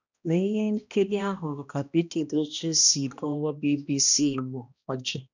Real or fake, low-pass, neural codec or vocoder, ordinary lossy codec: fake; 7.2 kHz; codec, 16 kHz, 1 kbps, X-Codec, HuBERT features, trained on balanced general audio; none